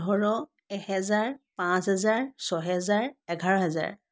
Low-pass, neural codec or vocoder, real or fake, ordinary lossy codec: none; none; real; none